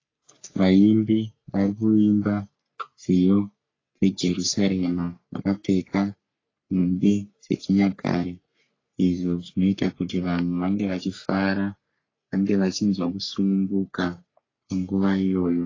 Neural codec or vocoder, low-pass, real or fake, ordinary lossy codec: codec, 44.1 kHz, 3.4 kbps, Pupu-Codec; 7.2 kHz; fake; AAC, 32 kbps